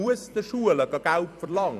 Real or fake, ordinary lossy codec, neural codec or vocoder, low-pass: fake; none; vocoder, 44.1 kHz, 128 mel bands every 256 samples, BigVGAN v2; 14.4 kHz